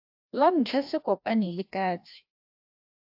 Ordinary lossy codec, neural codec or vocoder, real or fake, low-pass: AAC, 48 kbps; codec, 16 kHz, 1 kbps, FreqCodec, larger model; fake; 5.4 kHz